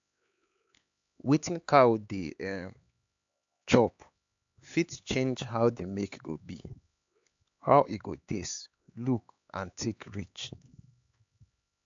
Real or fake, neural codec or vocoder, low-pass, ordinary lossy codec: fake; codec, 16 kHz, 4 kbps, X-Codec, HuBERT features, trained on LibriSpeech; 7.2 kHz; AAC, 64 kbps